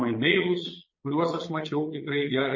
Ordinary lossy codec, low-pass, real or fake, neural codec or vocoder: MP3, 32 kbps; 7.2 kHz; fake; vocoder, 22.05 kHz, 80 mel bands, Vocos